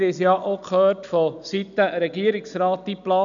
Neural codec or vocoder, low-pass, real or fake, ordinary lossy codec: none; 7.2 kHz; real; none